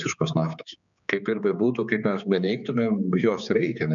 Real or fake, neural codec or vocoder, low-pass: fake; codec, 16 kHz, 4 kbps, X-Codec, HuBERT features, trained on general audio; 7.2 kHz